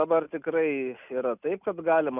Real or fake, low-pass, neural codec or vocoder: real; 3.6 kHz; none